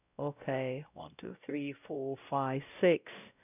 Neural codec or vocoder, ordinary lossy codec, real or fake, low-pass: codec, 16 kHz, 0.5 kbps, X-Codec, WavLM features, trained on Multilingual LibriSpeech; none; fake; 3.6 kHz